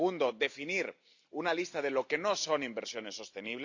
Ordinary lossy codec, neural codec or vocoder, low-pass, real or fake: AAC, 48 kbps; none; 7.2 kHz; real